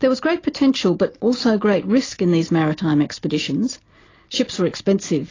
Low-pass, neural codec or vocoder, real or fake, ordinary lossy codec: 7.2 kHz; none; real; AAC, 32 kbps